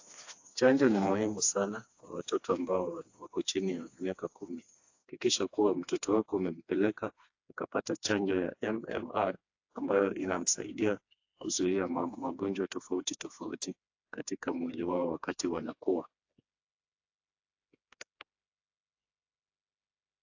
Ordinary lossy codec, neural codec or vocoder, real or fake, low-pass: AAC, 48 kbps; codec, 16 kHz, 2 kbps, FreqCodec, smaller model; fake; 7.2 kHz